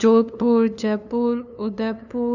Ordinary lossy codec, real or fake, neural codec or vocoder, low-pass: none; fake; codec, 16 kHz, 2 kbps, FunCodec, trained on LibriTTS, 25 frames a second; 7.2 kHz